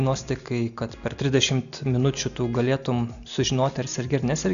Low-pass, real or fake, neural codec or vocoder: 7.2 kHz; real; none